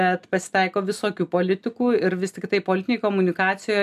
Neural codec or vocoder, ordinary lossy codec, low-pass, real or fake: none; AAC, 96 kbps; 14.4 kHz; real